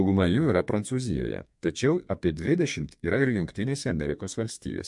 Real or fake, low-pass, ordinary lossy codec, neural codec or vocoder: fake; 10.8 kHz; MP3, 64 kbps; codec, 32 kHz, 1.9 kbps, SNAC